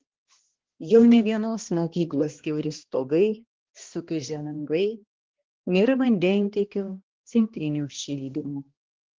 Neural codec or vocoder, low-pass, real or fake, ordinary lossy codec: codec, 16 kHz, 1 kbps, X-Codec, HuBERT features, trained on balanced general audio; 7.2 kHz; fake; Opus, 16 kbps